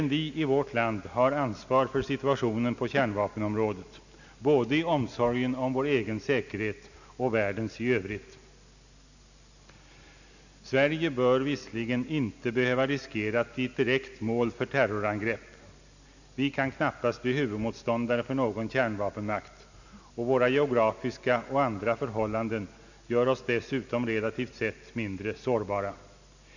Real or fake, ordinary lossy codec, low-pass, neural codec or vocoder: real; none; 7.2 kHz; none